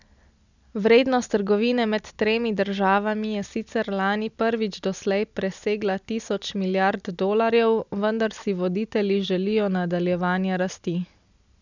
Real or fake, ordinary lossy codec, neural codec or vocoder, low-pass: real; none; none; 7.2 kHz